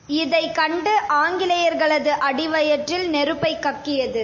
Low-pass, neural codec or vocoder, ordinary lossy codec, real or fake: 7.2 kHz; none; MP3, 32 kbps; real